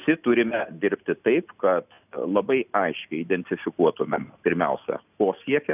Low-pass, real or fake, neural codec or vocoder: 3.6 kHz; real; none